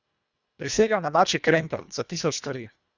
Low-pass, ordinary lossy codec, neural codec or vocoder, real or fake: 7.2 kHz; Opus, 64 kbps; codec, 24 kHz, 1.5 kbps, HILCodec; fake